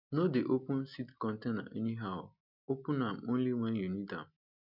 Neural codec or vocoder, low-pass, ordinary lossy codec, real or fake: none; 5.4 kHz; none; real